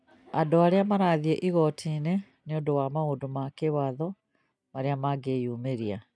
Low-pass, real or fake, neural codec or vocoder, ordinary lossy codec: none; real; none; none